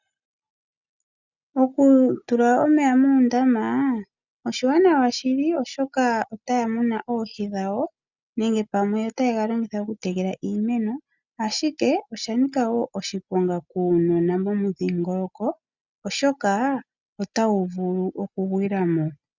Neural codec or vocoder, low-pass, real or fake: none; 7.2 kHz; real